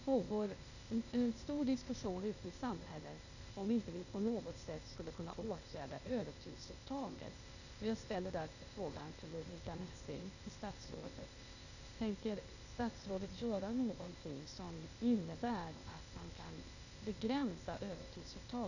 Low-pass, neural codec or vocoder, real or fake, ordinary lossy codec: 7.2 kHz; codec, 16 kHz, 0.8 kbps, ZipCodec; fake; none